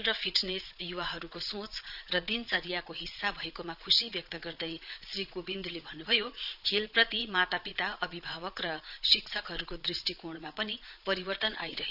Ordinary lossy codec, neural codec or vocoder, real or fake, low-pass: none; vocoder, 22.05 kHz, 80 mel bands, Vocos; fake; 5.4 kHz